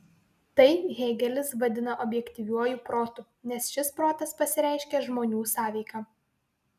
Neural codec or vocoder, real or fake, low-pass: none; real; 14.4 kHz